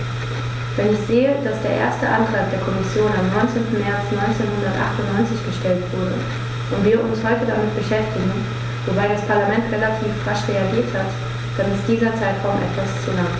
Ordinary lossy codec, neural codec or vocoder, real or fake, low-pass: none; none; real; none